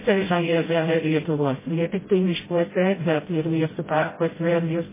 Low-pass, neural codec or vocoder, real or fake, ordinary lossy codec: 3.6 kHz; codec, 16 kHz, 0.5 kbps, FreqCodec, smaller model; fake; MP3, 16 kbps